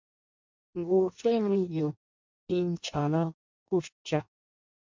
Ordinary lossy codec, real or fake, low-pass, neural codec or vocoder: MP3, 64 kbps; fake; 7.2 kHz; codec, 16 kHz in and 24 kHz out, 0.6 kbps, FireRedTTS-2 codec